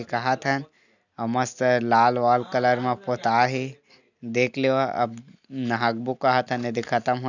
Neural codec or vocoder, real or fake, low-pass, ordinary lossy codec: none; real; 7.2 kHz; none